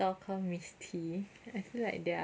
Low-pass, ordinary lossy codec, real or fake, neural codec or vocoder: none; none; real; none